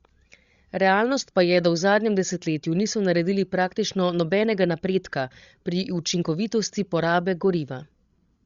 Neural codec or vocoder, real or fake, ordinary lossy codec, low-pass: codec, 16 kHz, 16 kbps, FreqCodec, larger model; fake; Opus, 64 kbps; 7.2 kHz